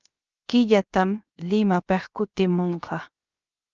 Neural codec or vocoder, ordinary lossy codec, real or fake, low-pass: codec, 16 kHz, 0.7 kbps, FocalCodec; Opus, 24 kbps; fake; 7.2 kHz